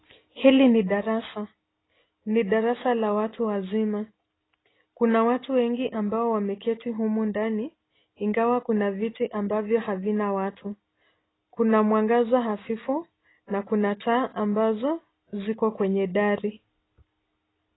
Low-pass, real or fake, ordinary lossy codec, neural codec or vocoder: 7.2 kHz; real; AAC, 16 kbps; none